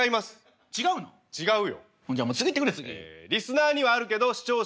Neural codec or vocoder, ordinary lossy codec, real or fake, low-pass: none; none; real; none